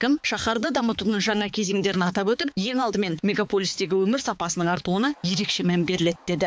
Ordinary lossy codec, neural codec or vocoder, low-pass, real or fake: none; codec, 16 kHz, 4 kbps, X-Codec, HuBERT features, trained on balanced general audio; none; fake